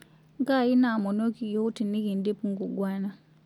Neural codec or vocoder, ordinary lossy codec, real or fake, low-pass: none; none; real; 19.8 kHz